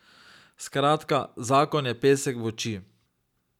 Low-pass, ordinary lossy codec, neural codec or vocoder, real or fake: 19.8 kHz; none; none; real